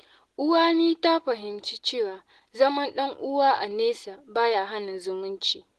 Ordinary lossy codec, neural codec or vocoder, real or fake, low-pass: Opus, 16 kbps; none; real; 10.8 kHz